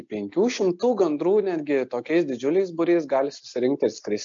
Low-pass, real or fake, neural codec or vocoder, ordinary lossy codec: 7.2 kHz; real; none; MP3, 48 kbps